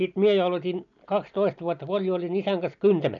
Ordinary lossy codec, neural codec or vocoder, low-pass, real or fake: none; none; 7.2 kHz; real